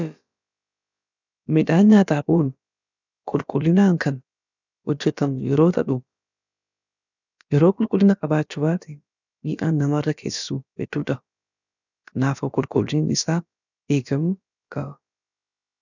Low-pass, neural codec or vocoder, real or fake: 7.2 kHz; codec, 16 kHz, about 1 kbps, DyCAST, with the encoder's durations; fake